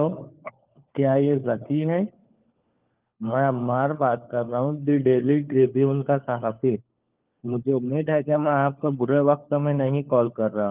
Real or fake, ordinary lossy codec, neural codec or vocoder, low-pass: fake; Opus, 24 kbps; codec, 16 kHz, 4 kbps, FunCodec, trained on LibriTTS, 50 frames a second; 3.6 kHz